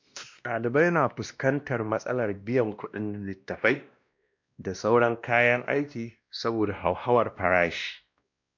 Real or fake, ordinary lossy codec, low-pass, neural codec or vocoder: fake; MP3, 64 kbps; 7.2 kHz; codec, 16 kHz, 1 kbps, X-Codec, WavLM features, trained on Multilingual LibriSpeech